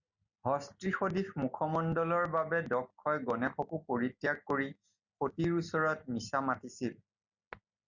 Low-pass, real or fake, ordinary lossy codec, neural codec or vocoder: 7.2 kHz; real; Opus, 64 kbps; none